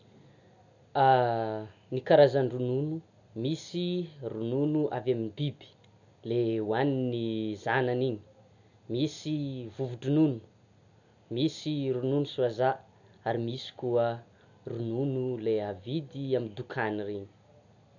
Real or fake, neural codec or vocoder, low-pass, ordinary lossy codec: real; none; 7.2 kHz; none